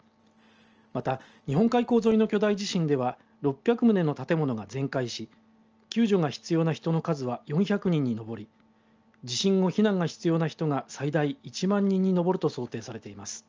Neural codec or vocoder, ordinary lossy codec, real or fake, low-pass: none; Opus, 24 kbps; real; 7.2 kHz